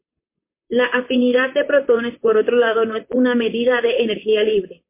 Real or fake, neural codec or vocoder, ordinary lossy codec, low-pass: fake; vocoder, 44.1 kHz, 128 mel bands, Pupu-Vocoder; MP3, 24 kbps; 3.6 kHz